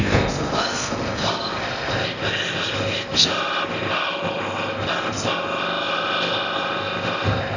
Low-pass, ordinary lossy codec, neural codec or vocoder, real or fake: 7.2 kHz; none; codec, 16 kHz in and 24 kHz out, 0.6 kbps, FocalCodec, streaming, 4096 codes; fake